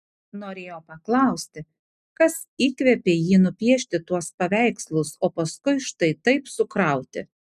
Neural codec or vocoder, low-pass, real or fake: none; 14.4 kHz; real